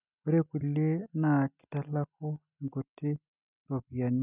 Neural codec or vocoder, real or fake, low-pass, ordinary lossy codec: none; real; 3.6 kHz; none